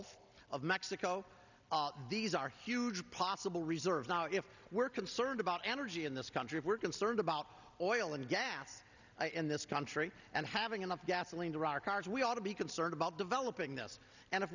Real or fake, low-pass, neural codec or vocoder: real; 7.2 kHz; none